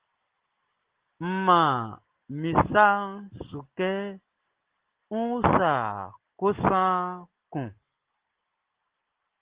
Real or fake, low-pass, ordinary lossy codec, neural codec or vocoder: real; 3.6 kHz; Opus, 16 kbps; none